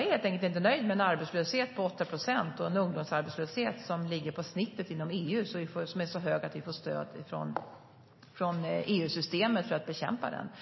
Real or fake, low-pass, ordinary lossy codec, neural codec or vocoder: real; 7.2 kHz; MP3, 24 kbps; none